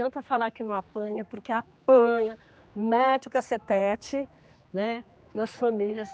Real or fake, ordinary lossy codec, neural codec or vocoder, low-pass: fake; none; codec, 16 kHz, 2 kbps, X-Codec, HuBERT features, trained on general audio; none